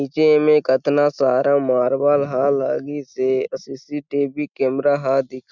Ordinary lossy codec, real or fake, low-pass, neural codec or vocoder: none; real; 7.2 kHz; none